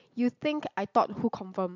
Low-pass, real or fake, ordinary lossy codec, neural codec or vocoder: 7.2 kHz; real; MP3, 64 kbps; none